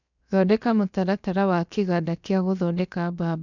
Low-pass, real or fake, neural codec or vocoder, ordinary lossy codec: 7.2 kHz; fake; codec, 16 kHz, about 1 kbps, DyCAST, with the encoder's durations; none